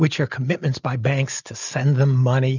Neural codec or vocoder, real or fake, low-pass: none; real; 7.2 kHz